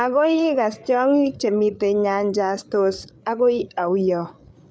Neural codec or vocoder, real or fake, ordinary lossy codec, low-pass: codec, 16 kHz, 8 kbps, FreqCodec, larger model; fake; none; none